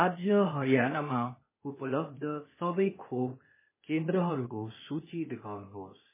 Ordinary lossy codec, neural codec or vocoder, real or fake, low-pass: MP3, 16 kbps; codec, 16 kHz, 0.8 kbps, ZipCodec; fake; 3.6 kHz